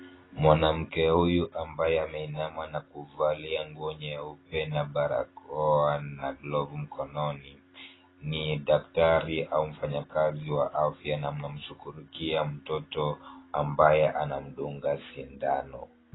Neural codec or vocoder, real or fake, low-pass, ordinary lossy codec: none; real; 7.2 kHz; AAC, 16 kbps